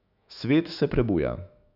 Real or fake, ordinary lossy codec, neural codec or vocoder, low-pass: fake; none; autoencoder, 48 kHz, 128 numbers a frame, DAC-VAE, trained on Japanese speech; 5.4 kHz